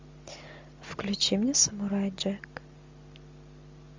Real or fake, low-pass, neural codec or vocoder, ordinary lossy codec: real; 7.2 kHz; none; MP3, 64 kbps